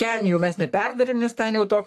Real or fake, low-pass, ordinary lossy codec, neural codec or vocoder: fake; 14.4 kHz; AAC, 64 kbps; codec, 44.1 kHz, 3.4 kbps, Pupu-Codec